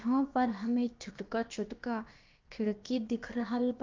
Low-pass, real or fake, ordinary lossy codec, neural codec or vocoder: 7.2 kHz; fake; Opus, 24 kbps; codec, 16 kHz, about 1 kbps, DyCAST, with the encoder's durations